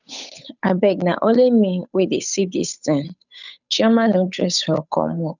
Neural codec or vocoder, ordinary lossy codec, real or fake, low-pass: codec, 16 kHz, 8 kbps, FunCodec, trained on Chinese and English, 25 frames a second; none; fake; 7.2 kHz